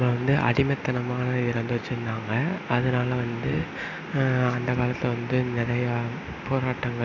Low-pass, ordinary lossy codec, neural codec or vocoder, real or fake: 7.2 kHz; none; none; real